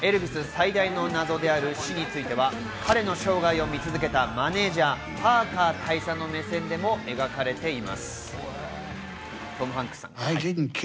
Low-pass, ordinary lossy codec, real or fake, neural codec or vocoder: none; none; real; none